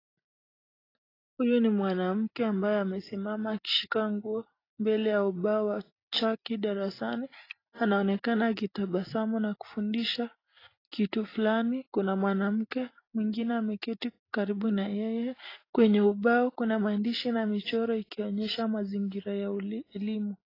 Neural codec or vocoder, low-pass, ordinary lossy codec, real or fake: none; 5.4 kHz; AAC, 24 kbps; real